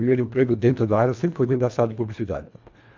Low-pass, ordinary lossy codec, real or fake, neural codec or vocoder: 7.2 kHz; MP3, 64 kbps; fake; codec, 24 kHz, 1.5 kbps, HILCodec